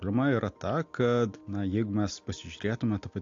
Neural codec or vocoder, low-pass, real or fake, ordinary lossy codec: none; 7.2 kHz; real; AAC, 48 kbps